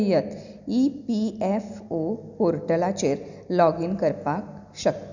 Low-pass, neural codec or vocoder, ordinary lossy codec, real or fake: 7.2 kHz; none; none; real